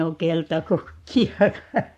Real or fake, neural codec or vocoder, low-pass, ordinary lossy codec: fake; codec, 44.1 kHz, 7.8 kbps, Pupu-Codec; 14.4 kHz; none